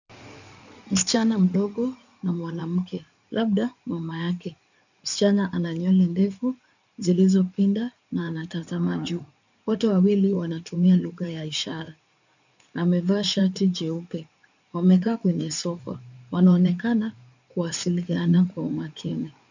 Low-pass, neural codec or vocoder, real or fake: 7.2 kHz; codec, 16 kHz in and 24 kHz out, 2.2 kbps, FireRedTTS-2 codec; fake